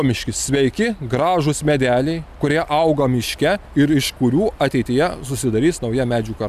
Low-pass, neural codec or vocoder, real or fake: 14.4 kHz; none; real